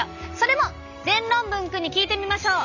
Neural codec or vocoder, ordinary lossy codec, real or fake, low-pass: none; none; real; 7.2 kHz